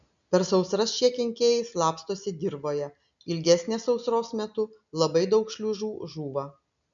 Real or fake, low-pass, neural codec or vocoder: real; 7.2 kHz; none